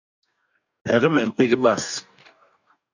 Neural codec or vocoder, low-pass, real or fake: codec, 44.1 kHz, 2.6 kbps, DAC; 7.2 kHz; fake